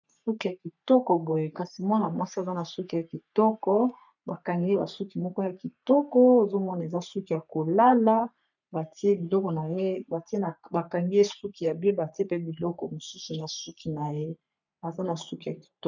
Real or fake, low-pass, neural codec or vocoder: fake; 7.2 kHz; codec, 44.1 kHz, 3.4 kbps, Pupu-Codec